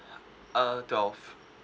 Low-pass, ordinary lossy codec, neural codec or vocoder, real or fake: none; none; none; real